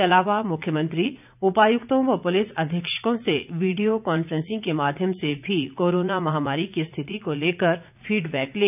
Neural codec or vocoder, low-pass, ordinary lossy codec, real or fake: vocoder, 44.1 kHz, 80 mel bands, Vocos; 3.6 kHz; none; fake